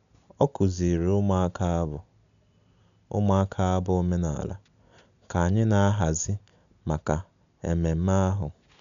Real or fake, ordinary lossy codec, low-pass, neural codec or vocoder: real; none; 7.2 kHz; none